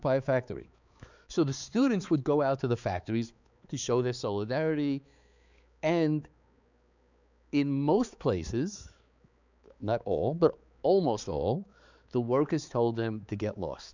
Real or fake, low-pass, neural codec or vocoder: fake; 7.2 kHz; codec, 16 kHz, 4 kbps, X-Codec, HuBERT features, trained on balanced general audio